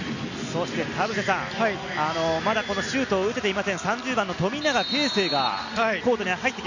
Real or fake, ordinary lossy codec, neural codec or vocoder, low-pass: real; none; none; 7.2 kHz